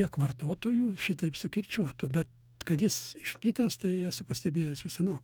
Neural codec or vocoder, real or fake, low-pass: codec, 44.1 kHz, 2.6 kbps, DAC; fake; 19.8 kHz